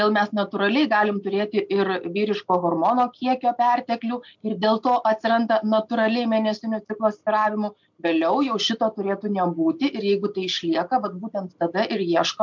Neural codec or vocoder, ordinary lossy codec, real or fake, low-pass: none; MP3, 64 kbps; real; 7.2 kHz